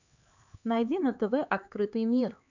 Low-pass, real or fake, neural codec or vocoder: 7.2 kHz; fake; codec, 16 kHz, 4 kbps, X-Codec, HuBERT features, trained on LibriSpeech